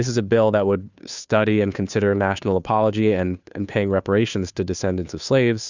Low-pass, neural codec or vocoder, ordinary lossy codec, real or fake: 7.2 kHz; codec, 16 kHz, 2 kbps, FunCodec, trained on Chinese and English, 25 frames a second; Opus, 64 kbps; fake